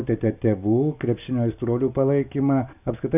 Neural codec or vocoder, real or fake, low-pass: none; real; 3.6 kHz